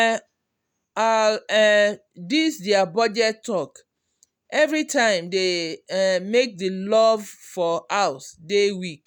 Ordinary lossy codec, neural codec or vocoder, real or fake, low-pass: none; none; real; 19.8 kHz